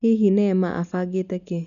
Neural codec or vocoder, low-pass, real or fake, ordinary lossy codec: none; 7.2 kHz; real; none